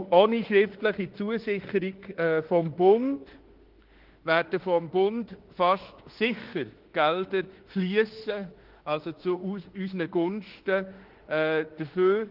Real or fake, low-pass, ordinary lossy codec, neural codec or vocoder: fake; 5.4 kHz; Opus, 16 kbps; autoencoder, 48 kHz, 32 numbers a frame, DAC-VAE, trained on Japanese speech